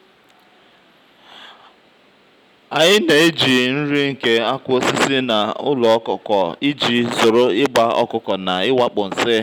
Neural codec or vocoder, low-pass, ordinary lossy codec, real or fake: none; 19.8 kHz; none; real